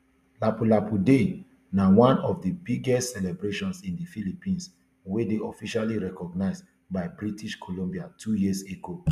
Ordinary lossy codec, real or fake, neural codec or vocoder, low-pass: MP3, 96 kbps; real; none; 14.4 kHz